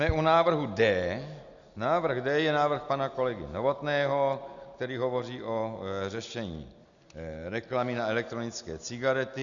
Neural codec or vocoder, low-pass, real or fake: none; 7.2 kHz; real